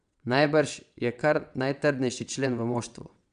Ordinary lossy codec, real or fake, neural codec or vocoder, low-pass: none; fake; vocoder, 22.05 kHz, 80 mel bands, WaveNeXt; 9.9 kHz